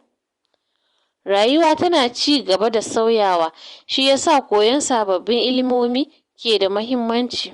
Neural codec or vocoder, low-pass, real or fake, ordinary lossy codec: vocoder, 24 kHz, 100 mel bands, Vocos; 10.8 kHz; fake; Opus, 64 kbps